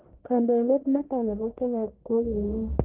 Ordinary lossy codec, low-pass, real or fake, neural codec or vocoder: Opus, 16 kbps; 3.6 kHz; fake; codec, 44.1 kHz, 1.7 kbps, Pupu-Codec